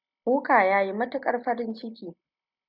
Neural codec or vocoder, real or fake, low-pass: none; real; 5.4 kHz